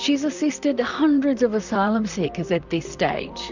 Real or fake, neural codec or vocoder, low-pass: fake; vocoder, 44.1 kHz, 128 mel bands, Pupu-Vocoder; 7.2 kHz